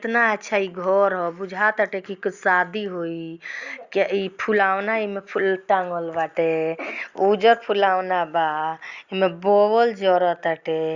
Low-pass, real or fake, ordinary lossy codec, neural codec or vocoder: 7.2 kHz; real; Opus, 64 kbps; none